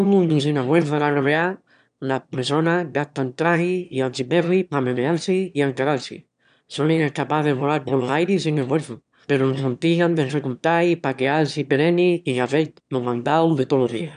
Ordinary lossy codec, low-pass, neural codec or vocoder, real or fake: none; 9.9 kHz; autoencoder, 22.05 kHz, a latent of 192 numbers a frame, VITS, trained on one speaker; fake